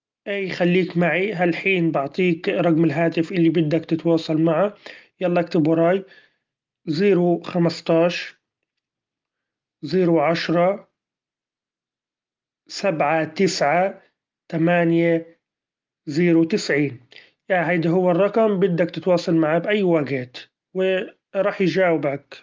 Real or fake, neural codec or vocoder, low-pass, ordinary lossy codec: real; none; 7.2 kHz; Opus, 24 kbps